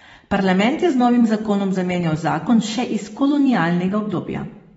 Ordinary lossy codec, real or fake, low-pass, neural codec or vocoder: AAC, 24 kbps; fake; 19.8 kHz; vocoder, 44.1 kHz, 128 mel bands every 256 samples, BigVGAN v2